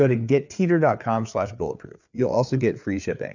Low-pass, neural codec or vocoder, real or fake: 7.2 kHz; codec, 16 kHz, 4 kbps, FreqCodec, larger model; fake